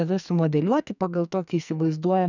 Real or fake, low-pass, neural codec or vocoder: fake; 7.2 kHz; codec, 44.1 kHz, 2.6 kbps, SNAC